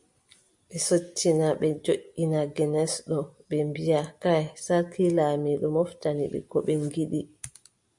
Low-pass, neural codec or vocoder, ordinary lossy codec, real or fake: 10.8 kHz; none; MP3, 64 kbps; real